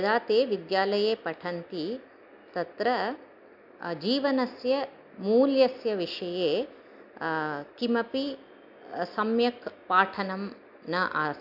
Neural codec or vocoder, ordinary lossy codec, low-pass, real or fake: none; AAC, 48 kbps; 5.4 kHz; real